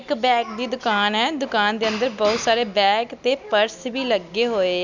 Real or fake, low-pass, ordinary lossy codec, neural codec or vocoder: real; 7.2 kHz; none; none